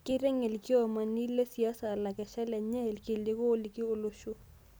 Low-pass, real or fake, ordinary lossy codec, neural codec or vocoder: none; real; none; none